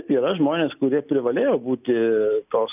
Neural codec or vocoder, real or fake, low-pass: none; real; 3.6 kHz